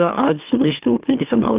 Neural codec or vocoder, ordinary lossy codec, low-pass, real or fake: autoencoder, 44.1 kHz, a latent of 192 numbers a frame, MeloTTS; Opus, 24 kbps; 3.6 kHz; fake